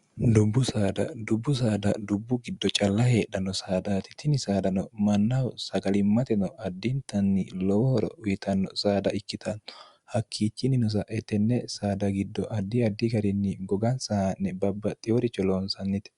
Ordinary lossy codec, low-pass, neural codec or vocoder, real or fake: Opus, 64 kbps; 10.8 kHz; none; real